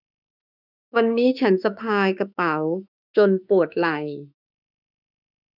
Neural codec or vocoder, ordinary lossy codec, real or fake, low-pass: autoencoder, 48 kHz, 32 numbers a frame, DAC-VAE, trained on Japanese speech; none; fake; 5.4 kHz